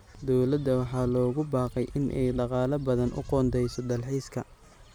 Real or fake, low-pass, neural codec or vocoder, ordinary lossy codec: real; none; none; none